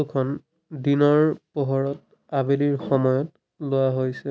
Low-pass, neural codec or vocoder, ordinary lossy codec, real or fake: none; none; none; real